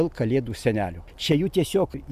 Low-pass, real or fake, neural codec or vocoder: 14.4 kHz; real; none